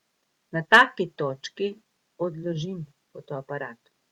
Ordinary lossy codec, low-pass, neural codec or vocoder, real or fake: Opus, 64 kbps; 19.8 kHz; vocoder, 44.1 kHz, 128 mel bands every 512 samples, BigVGAN v2; fake